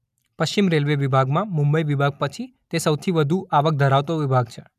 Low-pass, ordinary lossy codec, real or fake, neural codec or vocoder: 14.4 kHz; none; real; none